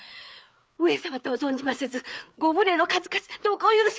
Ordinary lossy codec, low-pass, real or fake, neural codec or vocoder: none; none; fake; codec, 16 kHz, 4 kbps, FreqCodec, larger model